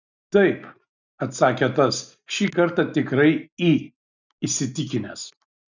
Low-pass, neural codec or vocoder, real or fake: 7.2 kHz; none; real